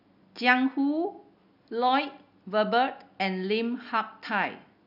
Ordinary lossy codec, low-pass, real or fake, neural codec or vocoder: none; 5.4 kHz; real; none